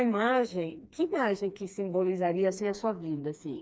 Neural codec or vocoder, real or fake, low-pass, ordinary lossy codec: codec, 16 kHz, 2 kbps, FreqCodec, smaller model; fake; none; none